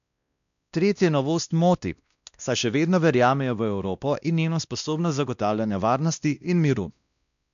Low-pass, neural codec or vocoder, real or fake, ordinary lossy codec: 7.2 kHz; codec, 16 kHz, 1 kbps, X-Codec, WavLM features, trained on Multilingual LibriSpeech; fake; none